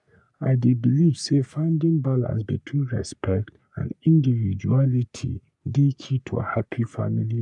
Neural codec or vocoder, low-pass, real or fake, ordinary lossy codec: codec, 44.1 kHz, 3.4 kbps, Pupu-Codec; 10.8 kHz; fake; none